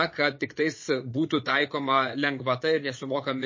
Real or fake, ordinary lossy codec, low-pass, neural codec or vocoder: fake; MP3, 32 kbps; 7.2 kHz; vocoder, 22.05 kHz, 80 mel bands, Vocos